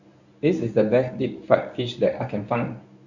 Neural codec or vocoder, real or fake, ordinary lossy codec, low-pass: codec, 24 kHz, 0.9 kbps, WavTokenizer, medium speech release version 1; fake; none; 7.2 kHz